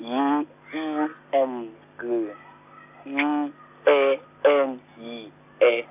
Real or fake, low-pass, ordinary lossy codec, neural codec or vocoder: real; 3.6 kHz; AAC, 32 kbps; none